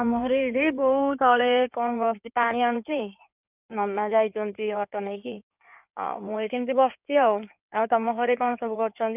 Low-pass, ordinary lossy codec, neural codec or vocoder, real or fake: 3.6 kHz; none; codec, 16 kHz in and 24 kHz out, 2.2 kbps, FireRedTTS-2 codec; fake